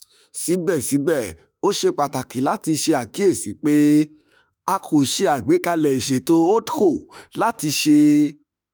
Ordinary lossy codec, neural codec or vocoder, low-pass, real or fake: none; autoencoder, 48 kHz, 32 numbers a frame, DAC-VAE, trained on Japanese speech; none; fake